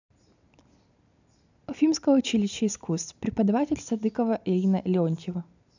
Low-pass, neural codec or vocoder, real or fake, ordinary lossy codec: 7.2 kHz; none; real; none